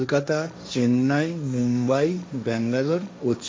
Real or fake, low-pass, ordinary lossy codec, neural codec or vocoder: fake; none; none; codec, 16 kHz, 1.1 kbps, Voila-Tokenizer